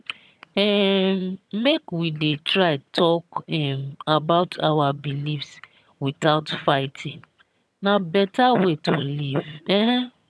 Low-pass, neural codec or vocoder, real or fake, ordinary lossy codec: none; vocoder, 22.05 kHz, 80 mel bands, HiFi-GAN; fake; none